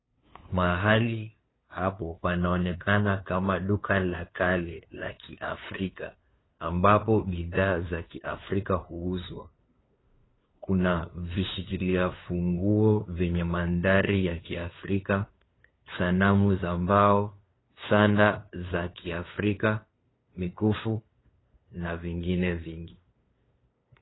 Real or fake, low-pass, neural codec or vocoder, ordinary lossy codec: fake; 7.2 kHz; codec, 16 kHz, 2 kbps, FunCodec, trained on LibriTTS, 25 frames a second; AAC, 16 kbps